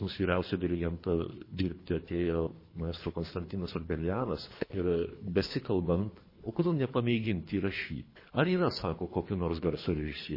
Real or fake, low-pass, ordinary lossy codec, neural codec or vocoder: fake; 5.4 kHz; MP3, 24 kbps; codec, 24 kHz, 3 kbps, HILCodec